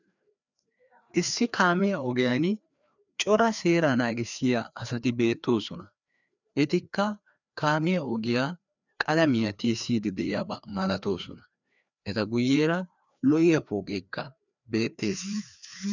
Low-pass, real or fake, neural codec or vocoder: 7.2 kHz; fake; codec, 16 kHz, 2 kbps, FreqCodec, larger model